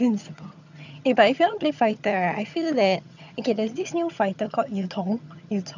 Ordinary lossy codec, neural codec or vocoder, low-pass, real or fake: none; vocoder, 22.05 kHz, 80 mel bands, HiFi-GAN; 7.2 kHz; fake